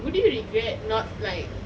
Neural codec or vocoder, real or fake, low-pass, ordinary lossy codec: none; real; none; none